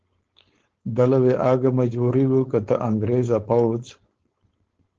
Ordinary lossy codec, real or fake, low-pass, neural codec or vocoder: Opus, 16 kbps; fake; 7.2 kHz; codec, 16 kHz, 4.8 kbps, FACodec